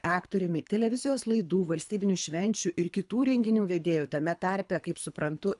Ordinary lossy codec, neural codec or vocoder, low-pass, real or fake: AAC, 96 kbps; codec, 24 kHz, 3 kbps, HILCodec; 10.8 kHz; fake